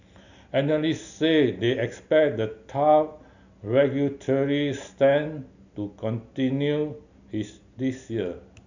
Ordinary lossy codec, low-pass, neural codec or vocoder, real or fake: none; 7.2 kHz; none; real